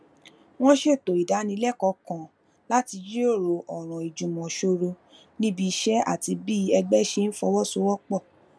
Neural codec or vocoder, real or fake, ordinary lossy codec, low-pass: none; real; none; none